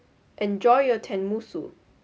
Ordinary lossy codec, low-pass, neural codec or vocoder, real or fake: none; none; none; real